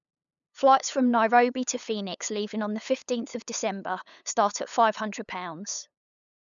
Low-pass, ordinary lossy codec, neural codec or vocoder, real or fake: 7.2 kHz; none; codec, 16 kHz, 8 kbps, FunCodec, trained on LibriTTS, 25 frames a second; fake